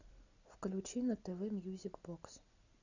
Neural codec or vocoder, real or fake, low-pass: none; real; 7.2 kHz